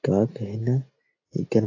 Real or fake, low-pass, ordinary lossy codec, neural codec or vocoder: real; 7.2 kHz; AAC, 32 kbps; none